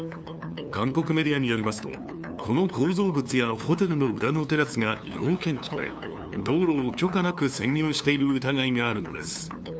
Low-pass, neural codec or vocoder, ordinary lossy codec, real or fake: none; codec, 16 kHz, 2 kbps, FunCodec, trained on LibriTTS, 25 frames a second; none; fake